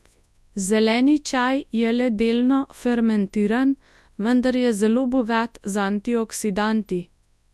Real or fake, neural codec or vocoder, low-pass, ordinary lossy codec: fake; codec, 24 kHz, 0.9 kbps, WavTokenizer, large speech release; none; none